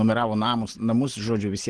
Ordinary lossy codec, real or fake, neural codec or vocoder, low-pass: Opus, 16 kbps; real; none; 9.9 kHz